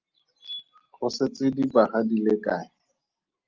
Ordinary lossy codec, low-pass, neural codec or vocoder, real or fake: Opus, 24 kbps; 7.2 kHz; none; real